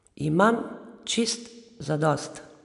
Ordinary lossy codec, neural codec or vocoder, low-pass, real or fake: none; none; 10.8 kHz; real